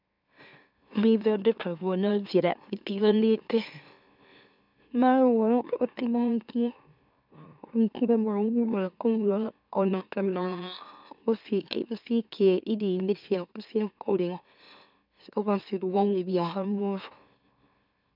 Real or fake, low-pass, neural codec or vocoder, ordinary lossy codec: fake; 5.4 kHz; autoencoder, 44.1 kHz, a latent of 192 numbers a frame, MeloTTS; none